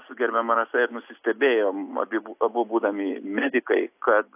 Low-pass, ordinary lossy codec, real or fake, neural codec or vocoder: 3.6 kHz; AAC, 32 kbps; real; none